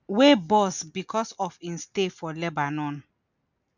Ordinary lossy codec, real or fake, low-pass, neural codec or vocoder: none; real; 7.2 kHz; none